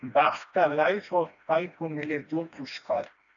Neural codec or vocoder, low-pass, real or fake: codec, 16 kHz, 1 kbps, FreqCodec, smaller model; 7.2 kHz; fake